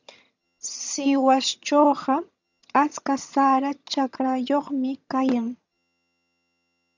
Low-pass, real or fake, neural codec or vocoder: 7.2 kHz; fake; vocoder, 22.05 kHz, 80 mel bands, HiFi-GAN